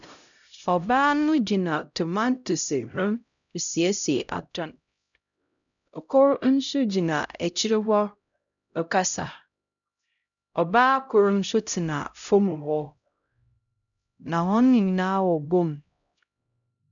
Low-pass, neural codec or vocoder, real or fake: 7.2 kHz; codec, 16 kHz, 0.5 kbps, X-Codec, HuBERT features, trained on LibriSpeech; fake